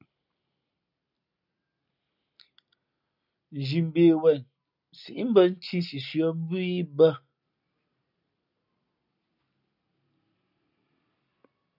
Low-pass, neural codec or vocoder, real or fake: 5.4 kHz; none; real